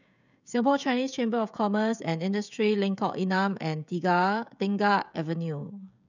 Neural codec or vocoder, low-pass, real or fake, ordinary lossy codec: codec, 16 kHz, 16 kbps, FreqCodec, smaller model; 7.2 kHz; fake; none